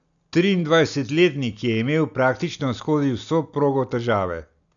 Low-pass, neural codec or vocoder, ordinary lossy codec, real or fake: 7.2 kHz; none; none; real